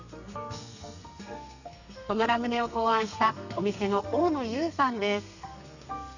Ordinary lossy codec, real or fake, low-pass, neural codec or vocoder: none; fake; 7.2 kHz; codec, 32 kHz, 1.9 kbps, SNAC